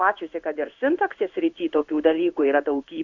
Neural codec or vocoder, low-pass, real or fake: codec, 16 kHz in and 24 kHz out, 1 kbps, XY-Tokenizer; 7.2 kHz; fake